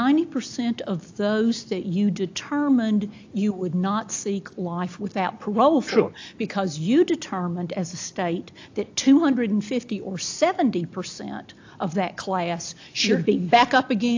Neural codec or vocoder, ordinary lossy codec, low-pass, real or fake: none; AAC, 48 kbps; 7.2 kHz; real